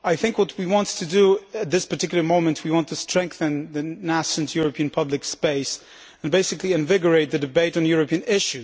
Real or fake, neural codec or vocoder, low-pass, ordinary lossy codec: real; none; none; none